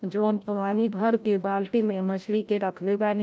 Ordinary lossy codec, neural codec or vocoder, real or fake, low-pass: none; codec, 16 kHz, 0.5 kbps, FreqCodec, larger model; fake; none